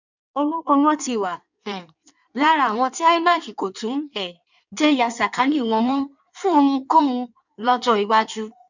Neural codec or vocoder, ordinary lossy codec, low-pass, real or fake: codec, 16 kHz in and 24 kHz out, 1.1 kbps, FireRedTTS-2 codec; none; 7.2 kHz; fake